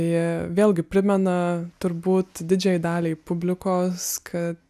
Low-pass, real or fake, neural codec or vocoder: 14.4 kHz; real; none